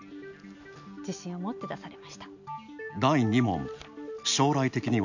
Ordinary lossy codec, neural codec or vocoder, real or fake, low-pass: none; none; real; 7.2 kHz